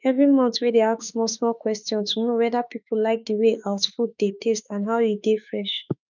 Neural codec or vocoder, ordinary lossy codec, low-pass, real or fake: autoencoder, 48 kHz, 32 numbers a frame, DAC-VAE, trained on Japanese speech; none; 7.2 kHz; fake